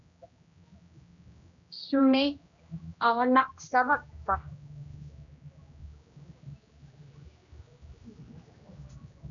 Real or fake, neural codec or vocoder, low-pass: fake; codec, 16 kHz, 1 kbps, X-Codec, HuBERT features, trained on general audio; 7.2 kHz